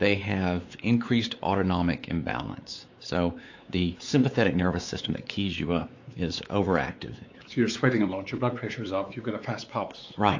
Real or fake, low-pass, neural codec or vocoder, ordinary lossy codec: fake; 7.2 kHz; codec, 16 kHz, 4 kbps, X-Codec, WavLM features, trained on Multilingual LibriSpeech; AAC, 48 kbps